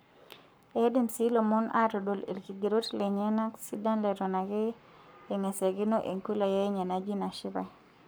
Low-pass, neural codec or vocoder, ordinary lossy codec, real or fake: none; codec, 44.1 kHz, 7.8 kbps, Pupu-Codec; none; fake